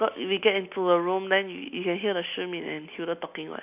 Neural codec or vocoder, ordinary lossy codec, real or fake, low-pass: none; none; real; 3.6 kHz